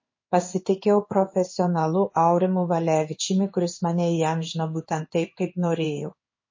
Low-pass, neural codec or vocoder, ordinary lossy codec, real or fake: 7.2 kHz; codec, 16 kHz in and 24 kHz out, 1 kbps, XY-Tokenizer; MP3, 32 kbps; fake